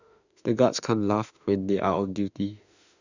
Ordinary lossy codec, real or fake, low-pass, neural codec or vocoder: none; fake; 7.2 kHz; autoencoder, 48 kHz, 32 numbers a frame, DAC-VAE, trained on Japanese speech